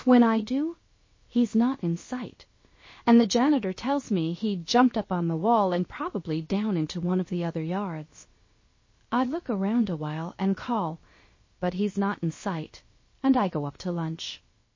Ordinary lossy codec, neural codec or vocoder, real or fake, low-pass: MP3, 32 kbps; codec, 16 kHz, about 1 kbps, DyCAST, with the encoder's durations; fake; 7.2 kHz